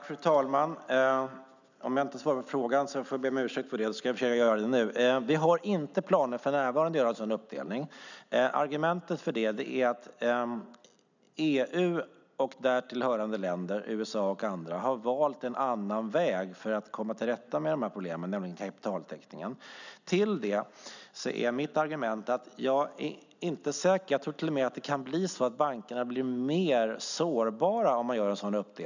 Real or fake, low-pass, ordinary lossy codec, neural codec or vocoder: real; 7.2 kHz; none; none